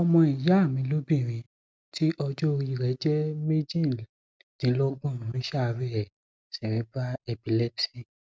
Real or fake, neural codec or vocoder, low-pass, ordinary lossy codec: real; none; none; none